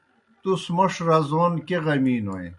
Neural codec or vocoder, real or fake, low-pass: none; real; 10.8 kHz